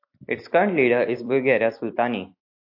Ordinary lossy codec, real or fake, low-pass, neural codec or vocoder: AAC, 48 kbps; fake; 5.4 kHz; vocoder, 44.1 kHz, 128 mel bands every 256 samples, BigVGAN v2